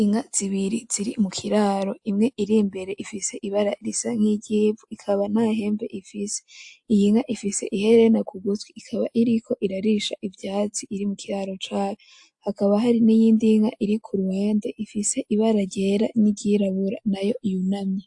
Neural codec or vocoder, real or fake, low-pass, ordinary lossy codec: none; real; 10.8 kHz; AAC, 64 kbps